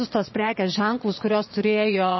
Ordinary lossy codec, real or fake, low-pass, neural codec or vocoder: MP3, 24 kbps; fake; 7.2 kHz; vocoder, 22.05 kHz, 80 mel bands, Vocos